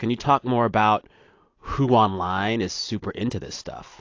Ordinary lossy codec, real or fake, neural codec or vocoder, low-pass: AAC, 48 kbps; real; none; 7.2 kHz